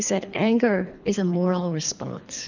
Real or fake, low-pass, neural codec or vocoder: fake; 7.2 kHz; codec, 24 kHz, 3 kbps, HILCodec